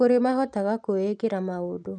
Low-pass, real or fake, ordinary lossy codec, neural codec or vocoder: 9.9 kHz; real; none; none